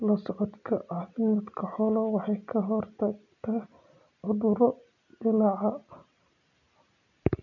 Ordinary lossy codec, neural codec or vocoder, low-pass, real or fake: none; none; 7.2 kHz; real